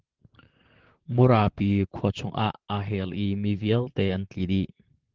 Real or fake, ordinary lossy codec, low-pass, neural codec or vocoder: fake; Opus, 16 kbps; 7.2 kHz; vocoder, 44.1 kHz, 128 mel bands, Pupu-Vocoder